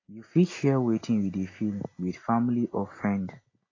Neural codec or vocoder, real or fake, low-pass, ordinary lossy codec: none; real; 7.2 kHz; AAC, 32 kbps